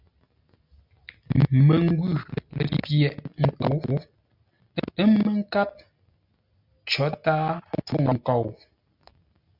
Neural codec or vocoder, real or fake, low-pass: none; real; 5.4 kHz